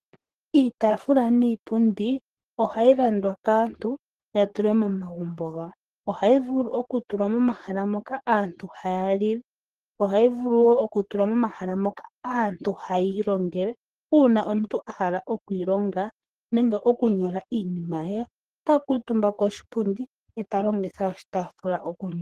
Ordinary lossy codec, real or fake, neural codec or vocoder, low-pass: Opus, 16 kbps; fake; codec, 44.1 kHz, 3.4 kbps, Pupu-Codec; 14.4 kHz